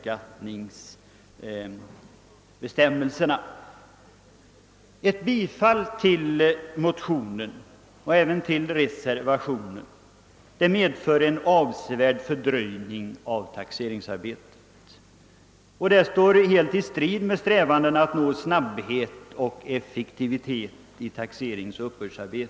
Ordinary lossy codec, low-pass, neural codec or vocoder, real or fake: none; none; none; real